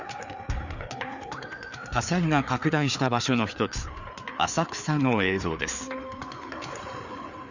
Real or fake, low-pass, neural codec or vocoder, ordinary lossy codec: fake; 7.2 kHz; codec, 16 kHz, 4 kbps, FreqCodec, larger model; none